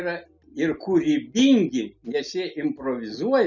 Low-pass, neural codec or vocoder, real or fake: 7.2 kHz; none; real